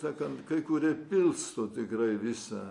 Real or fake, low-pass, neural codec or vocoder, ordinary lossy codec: real; 10.8 kHz; none; MP3, 48 kbps